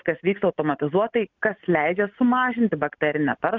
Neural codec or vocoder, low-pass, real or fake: none; 7.2 kHz; real